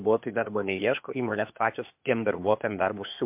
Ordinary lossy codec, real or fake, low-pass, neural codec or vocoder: MP3, 32 kbps; fake; 3.6 kHz; codec, 16 kHz, 0.8 kbps, ZipCodec